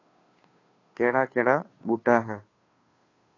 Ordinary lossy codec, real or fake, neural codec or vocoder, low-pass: AAC, 32 kbps; fake; codec, 16 kHz, 2 kbps, FunCodec, trained on Chinese and English, 25 frames a second; 7.2 kHz